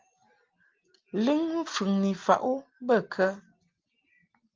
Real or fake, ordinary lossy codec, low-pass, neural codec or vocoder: real; Opus, 32 kbps; 7.2 kHz; none